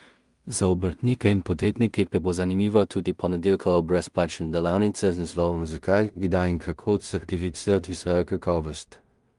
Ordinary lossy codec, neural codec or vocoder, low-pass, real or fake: Opus, 32 kbps; codec, 16 kHz in and 24 kHz out, 0.4 kbps, LongCat-Audio-Codec, two codebook decoder; 10.8 kHz; fake